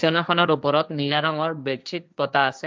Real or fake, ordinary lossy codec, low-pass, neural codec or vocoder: fake; none; none; codec, 16 kHz, 1.1 kbps, Voila-Tokenizer